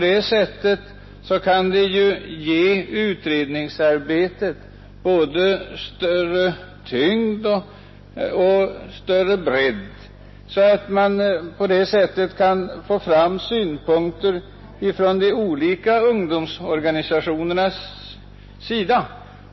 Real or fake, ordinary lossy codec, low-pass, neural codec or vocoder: real; MP3, 24 kbps; 7.2 kHz; none